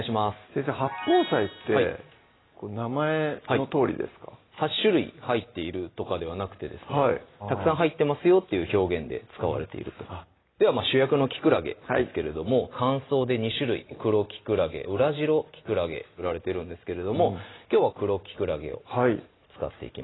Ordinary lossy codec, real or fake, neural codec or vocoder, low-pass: AAC, 16 kbps; real; none; 7.2 kHz